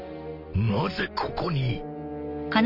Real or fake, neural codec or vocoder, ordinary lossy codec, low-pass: real; none; none; 5.4 kHz